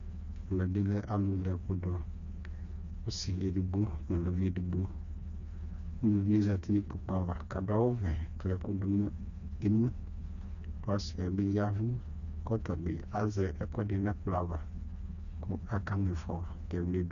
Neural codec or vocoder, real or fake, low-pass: codec, 16 kHz, 2 kbps, FreqCodec, smaller model; fake; 7.2 kHz